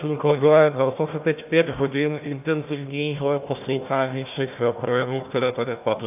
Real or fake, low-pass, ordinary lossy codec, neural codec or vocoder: fake; 3.6 kHz; AAC, 32 kbps; codec, 16 kHz, 1 kbps, FunCodec, trained on Chinese and English, 50 frames a second